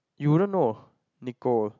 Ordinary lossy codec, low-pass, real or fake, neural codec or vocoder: none; 7.2 kHz; real; none